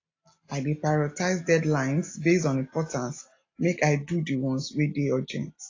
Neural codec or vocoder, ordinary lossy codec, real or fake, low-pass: none; AAC, 32 kbps; real; 7.2 kHz